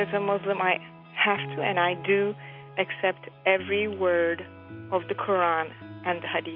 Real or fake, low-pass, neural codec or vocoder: real; 5.4 kHz; none